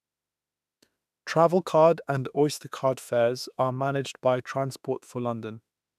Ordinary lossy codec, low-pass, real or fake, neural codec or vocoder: none; 14.4 kHz; fake; autoencoder, 48 kHz, 32 numbers a frame, DAC-VAE, trained on Japanese speech